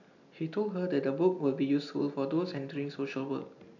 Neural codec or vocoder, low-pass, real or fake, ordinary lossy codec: none; 7.2 kHz; real; none